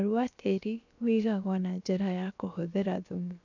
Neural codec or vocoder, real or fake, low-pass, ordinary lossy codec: codec, 16 kHz, 0.7 kbps, FocalCodec; fake; 7.2 kHz; none